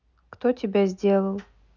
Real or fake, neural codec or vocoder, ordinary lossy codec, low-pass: real; none; none; 7.2 kHz